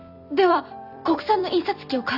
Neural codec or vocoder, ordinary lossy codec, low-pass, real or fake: vocoder, 44.1 kHz, 128 mel bands every 256 samples, BigVGAN v2; none; 5.4 kHz; fake